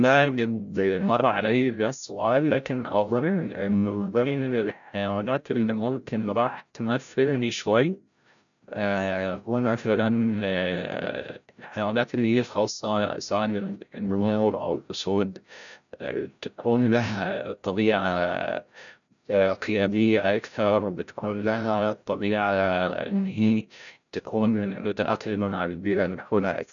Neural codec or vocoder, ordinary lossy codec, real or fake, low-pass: codec, 16 kHz, 0.5 kbps, FreqCodec, larger model; none; fake; 7.2 kHz